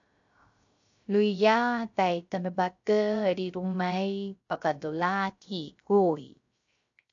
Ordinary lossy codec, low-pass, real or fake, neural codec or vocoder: AAC, 64 kbps; 7.2 kHz; fake; codec, 16 kHz, 0.3 kbps, FocalCodec